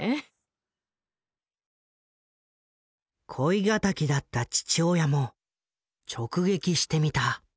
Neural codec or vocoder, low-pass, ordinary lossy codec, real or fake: none; none; none; real